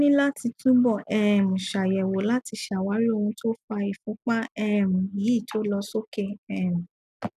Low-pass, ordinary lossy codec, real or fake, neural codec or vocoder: 14.4 kHz; none; real; none